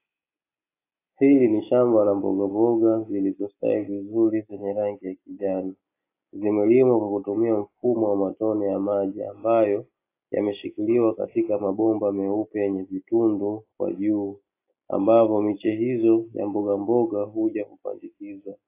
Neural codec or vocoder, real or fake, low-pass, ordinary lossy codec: none; real; 3.6 kHz; AAC, 24 kbps